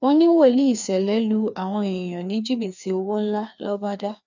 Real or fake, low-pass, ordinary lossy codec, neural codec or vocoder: fake; 7.2 kHz; none; codec, 16 kHz, 2 kbps, FreqCodec, larger model